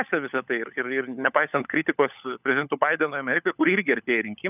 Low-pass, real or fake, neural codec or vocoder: 3.6 kHz; fake; codec, 16 kHz, 16 kbps, FunCodec, trained on LibriTTS, 50 frames a second